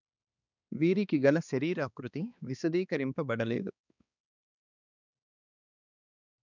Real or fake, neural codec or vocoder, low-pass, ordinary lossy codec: fake; codec, 16 kHz, 2 kbps, X-Codec, HuBERT features, trained on balanced general audio; 7.2 kHz; none